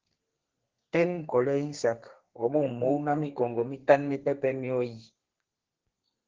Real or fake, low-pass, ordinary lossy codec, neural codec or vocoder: fake; 7.2 kHz; Opus, 16 kbps; codec, 32 kHz, 1.9 kbps, SNAC